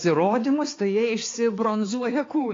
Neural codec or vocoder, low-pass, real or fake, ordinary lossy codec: codec, 16 kHz, 4 kbps, X-Codec, HuBERT features, trained on balanced general audio; 7.2 kHz; fake; AAC, 32 kbps